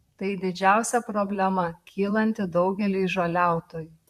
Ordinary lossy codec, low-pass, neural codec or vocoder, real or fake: MP3, 96 kbps; 14.4 kHz; vocoder, 44.1 kHz, 128 mel bands, Pupu-Vocoder; fake